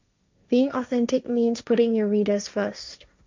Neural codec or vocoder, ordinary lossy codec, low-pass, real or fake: codec, 16 kHz, 1.1 kbps, Voila-Tokenizer; MP3, 64 kbps; 7.2 kHz; fake